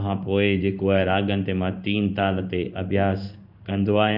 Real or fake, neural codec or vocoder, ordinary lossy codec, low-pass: fake; codec, 16 kHz in and 24 kHz out, 1 kbps, XY-Tokenizer; none; 5.4 kHz